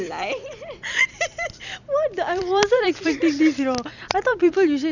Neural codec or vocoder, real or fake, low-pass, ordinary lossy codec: vocoder, 44.1 kHz, 128 mel bands every 256 samples, BigVGAN v2; fake; 7.2 kHz; none